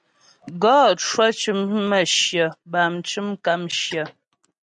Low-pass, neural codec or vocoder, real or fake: 9.9 kHz; none; real